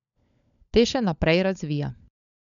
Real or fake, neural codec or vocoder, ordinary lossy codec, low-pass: fake; codec, 16 kHz, 16 kbps, FunCodec, trained on LibriTTS, 50 frames a second; none; 7.2 kHz